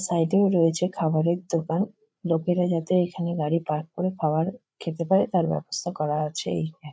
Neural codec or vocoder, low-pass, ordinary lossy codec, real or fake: codec, 16 kHz, 8 kbps, FreqCodec, larger model; none; none; fake